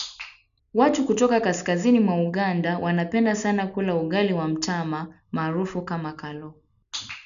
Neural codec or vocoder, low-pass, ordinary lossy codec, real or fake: none; 7.2 kHz; none; real